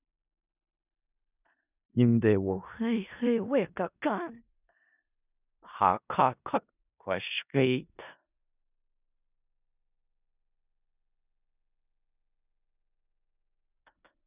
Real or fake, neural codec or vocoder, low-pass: fake; codec, 16 kHz in and 24 kHz out, 0.4 kbps, LongCat-Audio-Codec, four codebook decoder; 3.6 kHz